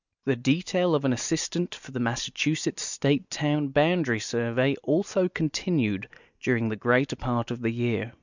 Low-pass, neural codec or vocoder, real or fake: 7.2 kHz; none; real